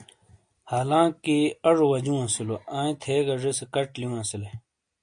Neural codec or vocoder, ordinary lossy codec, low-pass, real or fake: none; MP3, 48 kbps; 9.9 kHz; real